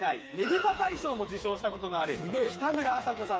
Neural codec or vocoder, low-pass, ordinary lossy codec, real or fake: codec, 16 kHz, 4 kbps, FreqCodec, smaller model; none; none; fake